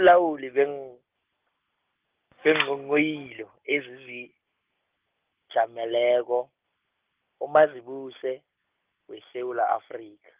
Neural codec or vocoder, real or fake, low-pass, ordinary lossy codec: none; real; 3.6 kHz; Opus, 32 kbps